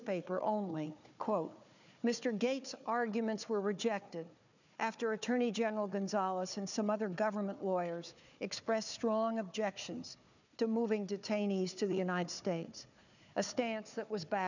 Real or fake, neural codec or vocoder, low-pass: fake; codec, 16 kHz, 4 kbps, FunCodec, trained on Chinese and English, 50 frames a second; 7.2 kHz